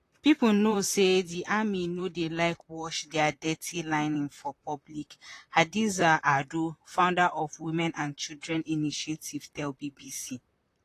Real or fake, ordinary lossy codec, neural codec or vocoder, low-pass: fake; AAC, 48 kbps; vocoder, 44.1 kHz, 128 mel bands, Pupu-Vocoder; 14.4 kHz